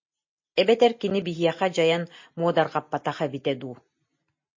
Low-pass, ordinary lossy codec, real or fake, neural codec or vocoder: 7.2 kHz; MP3, 32 kbps; real; none